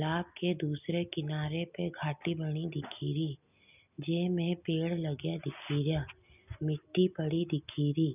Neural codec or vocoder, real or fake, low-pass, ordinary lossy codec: none; real; 3.6 kHz; none